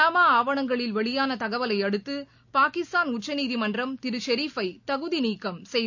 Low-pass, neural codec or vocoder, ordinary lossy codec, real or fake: 7.2 kHz; none; none; real